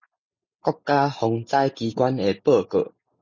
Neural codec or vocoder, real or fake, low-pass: none; real; 7.2 kHz